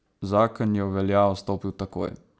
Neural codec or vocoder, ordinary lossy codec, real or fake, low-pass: none; none; real; none